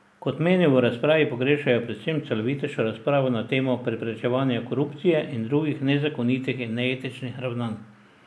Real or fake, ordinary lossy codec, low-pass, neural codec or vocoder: real; none; none; none